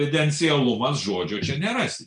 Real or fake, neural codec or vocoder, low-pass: real; none; 9.9 kHz